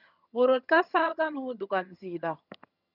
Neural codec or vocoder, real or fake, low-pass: vocoder, 22.05 kHz, 80 mel bands, HiFi-GAN; fake; 5.4 kHz